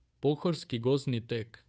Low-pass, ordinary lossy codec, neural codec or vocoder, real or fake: none; none; codec, 16 kHz, 2 kbps, FunCodec, trained on Chinese and English, 25 frames a second; fake